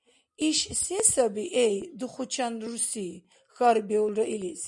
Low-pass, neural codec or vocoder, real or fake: 10.8 kHz; none; real